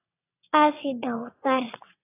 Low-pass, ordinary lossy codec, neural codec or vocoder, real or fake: 3.6 kHz; AAC, 16 kbps; vocoder, 22.05 kHz, 80 mel bands, WaveNeXt; fake